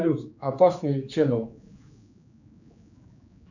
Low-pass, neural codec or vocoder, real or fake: 7.2 kHz; codec, 16 kHz, 4 kbps, X-Codec, HuBERT features, trained on general audio; fake